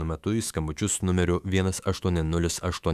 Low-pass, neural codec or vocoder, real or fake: 14.4 kHz; autoencoder, 48 kHz, 128 numbers a frame, DAC-VAE, trained on Japanese speech; fake